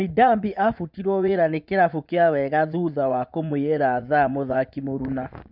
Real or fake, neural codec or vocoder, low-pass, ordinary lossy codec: fake; vocoder, 24 kHz, 100 mel bands, Vocos; 5.4 kHz; none